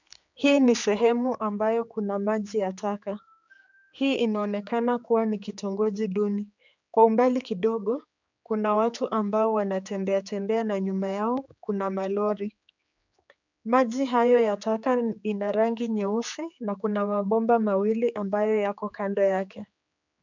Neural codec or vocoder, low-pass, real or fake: codec, 16 kHz, 4 kbps, X-Codec, HuBERT features, trained on general audio; 7.2 kHz; fake